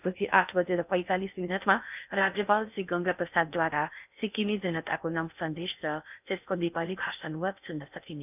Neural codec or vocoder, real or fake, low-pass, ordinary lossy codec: codec, 16 kHz in and 24 kHz out, 0.6 kbps, FocalCodec, streaming, 2048 codes; fake; 3.6 kHz; none